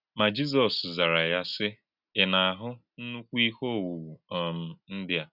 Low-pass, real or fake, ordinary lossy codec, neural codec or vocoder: 5.4 kHz; real; none; none